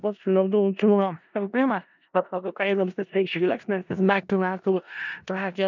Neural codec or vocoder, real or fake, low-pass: codec, 16 kHz in and 24 kHz out, 0.4 kbps, LongCat-Audio-Codec, four codebook decoder; fake; 7.2 kHz